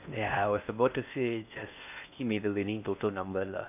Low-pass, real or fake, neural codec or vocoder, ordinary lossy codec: 3.6 kHz; fake; codec, 16 kHz in and 24 kHz out, 0.6 kbps, FocalCodec, streaming, 4096 codes; none